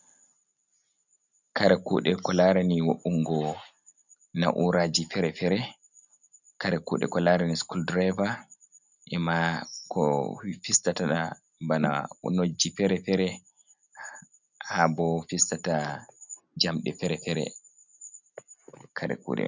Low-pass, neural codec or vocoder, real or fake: 7.2 kHz; none; real